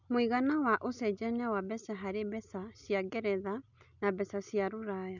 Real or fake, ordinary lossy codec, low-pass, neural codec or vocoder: real; none; 7.2 kHz; none